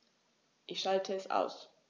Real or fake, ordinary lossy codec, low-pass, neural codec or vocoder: real; none; none; none